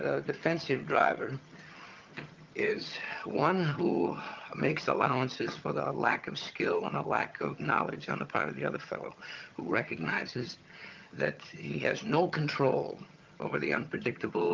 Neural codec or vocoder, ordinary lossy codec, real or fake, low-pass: vocoder, 22.05 kHz, 80 mel bands, HiFi-GAN; Opus, 32 kbps; fake; 7.2 kHz